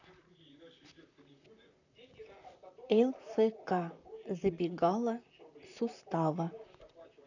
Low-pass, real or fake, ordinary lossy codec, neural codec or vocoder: 7.2 kHz; fake; none; vocoder, 44.1 kHz, 128 mel bands, Pupu-Vocoder